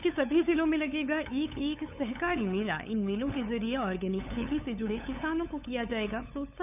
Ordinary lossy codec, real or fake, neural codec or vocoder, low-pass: none; fake; codec, 16 kHz, 8 kbps, FunCodec, trained on LibriTTS, 25 frames a second; 3.6 kHz